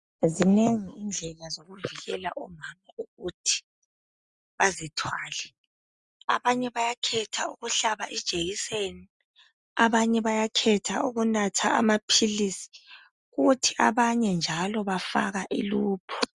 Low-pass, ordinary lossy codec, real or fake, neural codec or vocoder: 10.8 kHz; Opus, 64 kbps; real; none